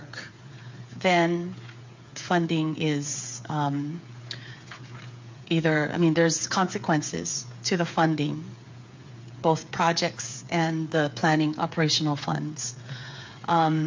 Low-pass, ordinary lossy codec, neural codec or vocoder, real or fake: 7.2 kHz; MP3, 48 kbps; codec, 16 kHz, 8 kbps, FreqCodec, smaller model; fake